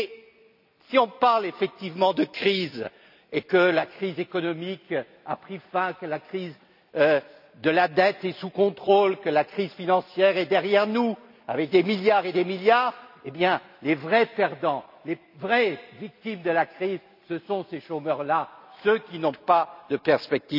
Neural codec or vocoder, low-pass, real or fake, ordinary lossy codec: none; 5.4 kHz; real; none